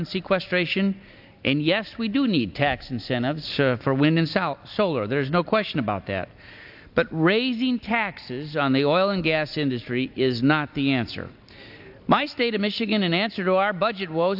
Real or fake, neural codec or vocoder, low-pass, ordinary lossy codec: real; none; 5.4 kHz; AAC, 48 kbps